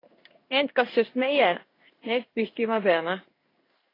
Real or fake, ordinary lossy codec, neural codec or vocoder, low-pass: fake; AAC, 24 kbps; codec, 16 kHz in and 24 kHz out, 1 kbps, XY-Tokenizer; 5.4 kHz